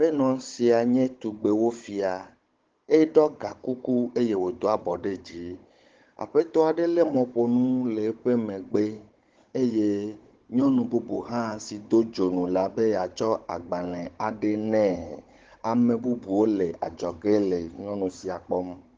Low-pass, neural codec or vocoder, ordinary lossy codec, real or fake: 7.2 kHz; codec, 16 kHz, 16 kbps, FunCodec, trained on Chinese and English, 50 frames a second; Opus, 16 kbps; fake